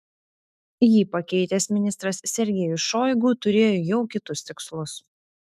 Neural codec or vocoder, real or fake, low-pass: autoencoder, 48 kHz, 128 numbers a frame, DAC-VAE, trained on Japanese speech; fake; 14.4 kHz